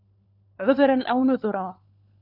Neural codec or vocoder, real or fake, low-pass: codec, 16 kHz, 4 kbps, FunCodec, trained on LibriTTS, 50 frames a second; fake; 5.4 kHz